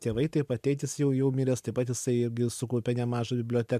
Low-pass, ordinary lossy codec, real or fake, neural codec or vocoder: 14.4 kHz; MP3, 96 kbps; fake; vocoder, 44.1 kHz, 128 mel bands every 256 samples, BigVGAN v2